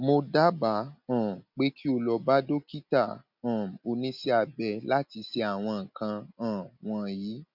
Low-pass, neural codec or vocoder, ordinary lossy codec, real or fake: 5.4 kHz; none; none; real